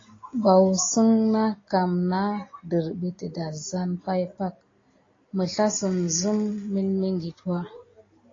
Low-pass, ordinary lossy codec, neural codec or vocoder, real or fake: 7.2 kHz; AAC, 32 kbps; none; real